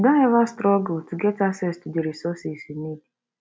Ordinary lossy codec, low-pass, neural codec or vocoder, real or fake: none; none; none; real